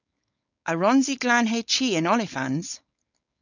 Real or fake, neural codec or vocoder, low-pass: fake; codec, 16 kHz, 4.8 kbps, FACodec; 7.2 kHz